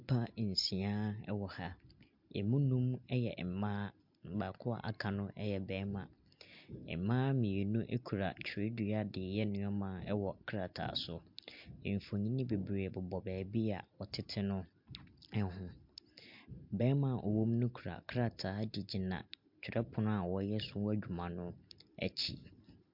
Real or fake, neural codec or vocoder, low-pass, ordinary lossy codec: real; none; 5.4 kHz; MP3, 48 kbps